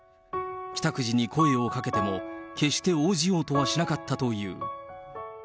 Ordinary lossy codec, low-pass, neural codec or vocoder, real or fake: none; none; none; real